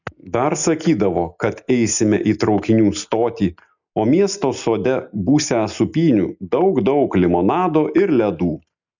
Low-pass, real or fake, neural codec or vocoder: 7.2 kHz; real; none